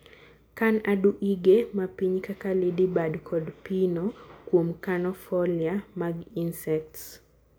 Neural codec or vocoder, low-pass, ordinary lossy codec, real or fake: none; none; none; real